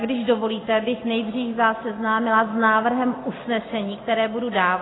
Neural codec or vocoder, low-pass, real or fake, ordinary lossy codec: none; 7.2 kHz; real; AAC, 16 kbps